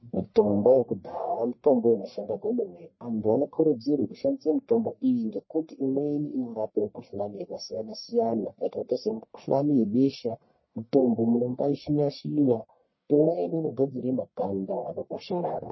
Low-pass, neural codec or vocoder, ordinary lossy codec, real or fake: 7.2 kHz; codec, 44.1 kHz, 1.7 kbps, Pupu-Codec; MP3, 24 kbps; fake